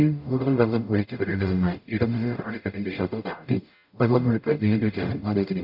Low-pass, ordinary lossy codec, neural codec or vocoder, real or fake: 5.4 kHz; none; codec, 44.1 kHz, 0.9 kbps, DAC; fake